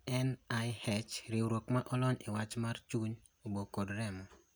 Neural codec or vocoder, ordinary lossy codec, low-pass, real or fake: none; none; none; real